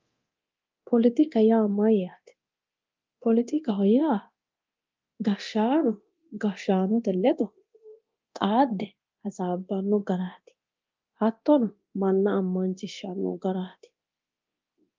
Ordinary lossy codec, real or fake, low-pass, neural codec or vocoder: Opus, 24 kbps; fake; 7.2 kHz; codec, 24 kHz, 0.9 kbps, DualCodec